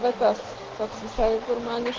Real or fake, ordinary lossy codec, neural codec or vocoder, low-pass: real; Opus, 16 kbps; none; 7.2 kHz